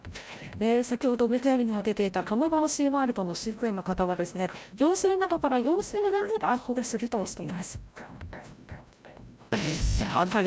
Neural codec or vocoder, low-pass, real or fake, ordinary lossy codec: codec, 16 kHz, 0.5 kbps, FreqCodec, larger model; none; fake; none